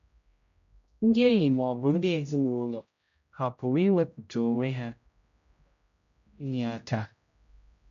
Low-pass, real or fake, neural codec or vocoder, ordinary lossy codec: 7.2 kHz; fake; codec, 16 kHz, 0.5 kbps, X-Codec, HuBERT features, trained on general audio; MP3, 64 kbps